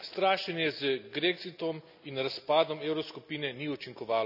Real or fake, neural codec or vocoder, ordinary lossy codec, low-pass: real; none; none; 5.4 kHz